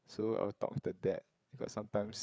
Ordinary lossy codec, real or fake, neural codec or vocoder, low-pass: none; fake; codec, 16 kHz, 8 kbps, FreqCodec, larger model; none